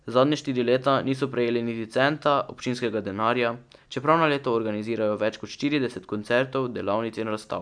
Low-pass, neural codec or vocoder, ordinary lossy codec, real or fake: 9.9 kHz; none; none; real